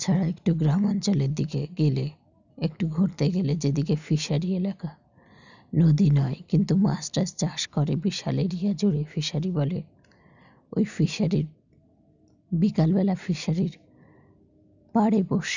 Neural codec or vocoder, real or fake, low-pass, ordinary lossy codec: none; real; 7.2 kHz; none